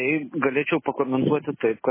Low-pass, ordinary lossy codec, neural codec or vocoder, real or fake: 3.6 kHz; MP3, 16 kbps; none; real